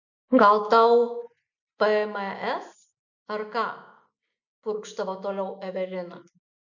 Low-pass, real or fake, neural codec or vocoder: 7.2 kHz; real; none